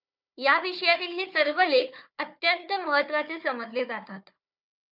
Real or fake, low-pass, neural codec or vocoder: fake; 5.4 kHz; codec, 16 kHz, 4 kbps, FunCodec, trained on Chinese and English, 50 frames a second